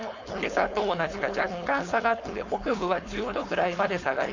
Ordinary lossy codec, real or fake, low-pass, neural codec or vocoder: MP3, 48 kbps; fake; 7.2 kHz; codec, 16 kHz, 4.8 kbps, FACodec